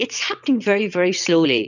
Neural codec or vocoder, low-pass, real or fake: vocoder, 22.05 kHz, 80 mel bands, WaveNeXt; 7.2 kHz; fake